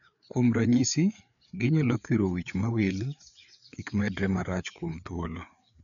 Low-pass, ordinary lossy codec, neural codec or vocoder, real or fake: 7.2 kHz; none; codec, 16 kHz, 4 kbps, FreqCodec, larger model; fake